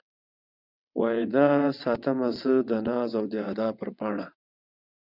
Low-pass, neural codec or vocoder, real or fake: 5.4 kHz; vocoder, 22.05 kHz, 80 mel bands, WaveNeXt; fake